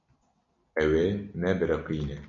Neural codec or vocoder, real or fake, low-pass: none; real; 7.2 kHz